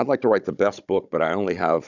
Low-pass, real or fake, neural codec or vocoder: 7.2 kHz; fake; codec, 16 kHz, 16 kbps, FunCodec, trained on Chinese and English, 50 frames a second